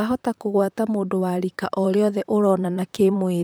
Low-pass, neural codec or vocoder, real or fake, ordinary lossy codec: none; vocoder, 44.1 kHz, 128 mel bands, Pupu-Vocoder; fake; none